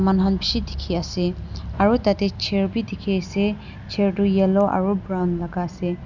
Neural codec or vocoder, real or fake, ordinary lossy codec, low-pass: none; real; none; 7.2 kHz